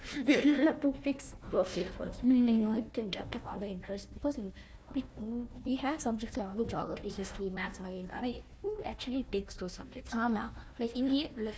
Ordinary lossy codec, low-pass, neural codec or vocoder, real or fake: none; none; codec, 16 kHz, 1 kbps, FunCodec, trained on Chinese and English, 50 frames a second; fake